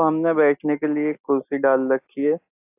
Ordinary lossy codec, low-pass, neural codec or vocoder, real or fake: AAC, 32 kbps; 3.6 kHz; none; real